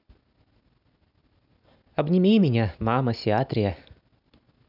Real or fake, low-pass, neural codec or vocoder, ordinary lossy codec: real; 5.4 kHz; none; none